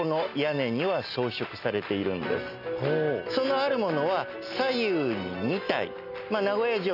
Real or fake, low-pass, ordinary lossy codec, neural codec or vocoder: real; 5.4 kHz; none; none